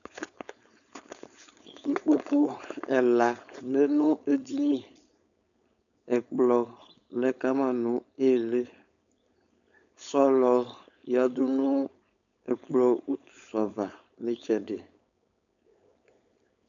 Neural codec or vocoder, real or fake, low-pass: codec, 16 kHz, 4.8 kbps, FACodec; fake; 7.2 kHz